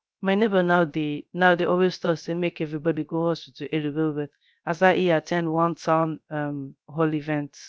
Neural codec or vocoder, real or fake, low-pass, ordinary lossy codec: codec, 16 kHz, 0.3 kbps, FocalCodec; fake; none; none